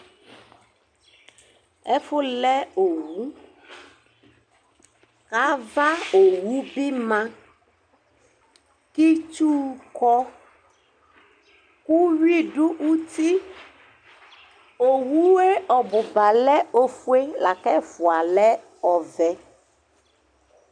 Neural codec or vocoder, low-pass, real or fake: none; 9.9 kHz; real